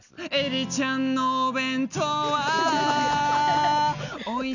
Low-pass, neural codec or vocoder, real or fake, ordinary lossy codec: 7.2 kHz; none; real; none